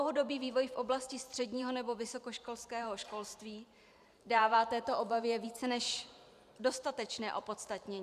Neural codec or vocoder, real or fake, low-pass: vocoder, 44.1 kHz, 128 mel bands every 512 samples, BigVGAN v2; fake; 14.4 kHz